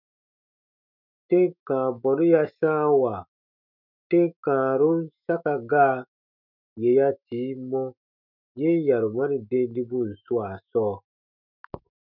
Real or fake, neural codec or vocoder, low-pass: fake; autoencoder, 48 kHz, 128 numbers a frame, DAC-VAE, trained on Japanese speech; 5.4 kHz